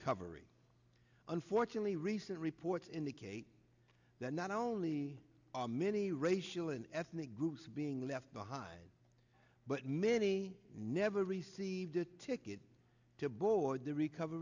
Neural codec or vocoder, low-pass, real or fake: none; 7.2 kHz; real